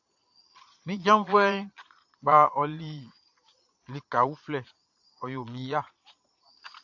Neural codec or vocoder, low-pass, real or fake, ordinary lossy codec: vocoder, 22.05 kHz, 80 mel bands, Vocos; 7.2 kHz; fake; AAC, 48 kbps